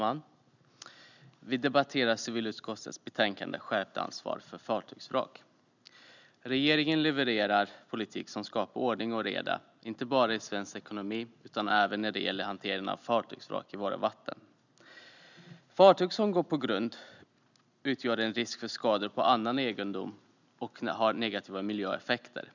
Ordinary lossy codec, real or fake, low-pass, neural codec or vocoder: none; real; 7.2 kHz; none